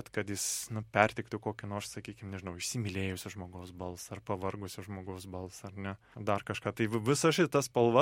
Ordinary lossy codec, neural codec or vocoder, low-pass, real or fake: MP3, 64 kbps; none; 19.8 kHz; real